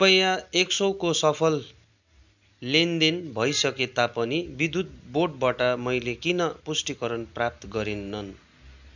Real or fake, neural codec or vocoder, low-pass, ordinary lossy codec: real; none; 7.2 kHz; none